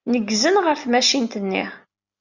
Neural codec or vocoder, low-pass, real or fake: none; 7.2 kHz; real